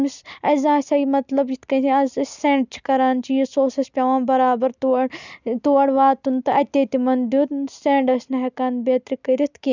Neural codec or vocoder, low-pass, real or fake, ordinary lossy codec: none; 7.2 kHz; real; none